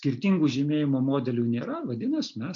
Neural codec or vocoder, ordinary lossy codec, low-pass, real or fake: none; MP3, 48 kbps; 7.2 kHz; real